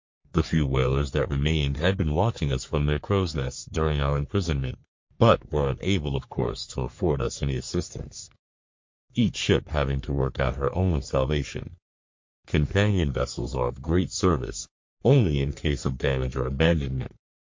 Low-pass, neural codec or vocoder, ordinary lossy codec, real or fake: 7.2 kHz; codec, 44.1 kHz, 3.4 kbps, Pupu-Codec; MP3, 48 kbps; fake